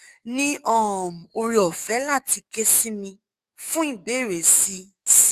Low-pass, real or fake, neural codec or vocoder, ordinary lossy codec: 14.4 kHz; fake; codec, 44.1 kHz, 7.8 kbps, DAC; Opus, 64 kbps